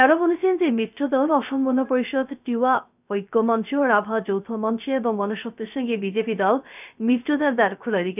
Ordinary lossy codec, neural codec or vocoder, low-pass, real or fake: none; codec, 16 kHz, 0.3 kbps, FocalCodec; 3.6 kHz; fake